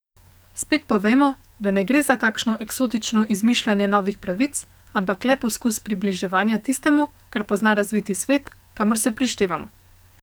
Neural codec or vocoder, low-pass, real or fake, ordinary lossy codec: codec, 44.1 kHz, 2.6 kbps, SNAC; none; fake; none